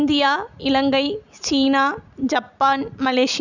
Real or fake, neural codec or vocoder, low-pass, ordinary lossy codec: real; none; 7.2 kHz; none